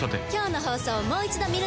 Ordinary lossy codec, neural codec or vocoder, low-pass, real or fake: none; none; none; real